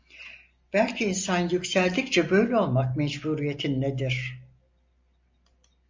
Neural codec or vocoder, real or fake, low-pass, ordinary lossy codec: none; real; 7.2 kHz; MP3, 64 kbps